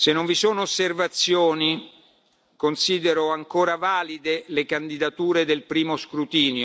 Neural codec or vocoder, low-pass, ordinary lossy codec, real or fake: none; none; none; real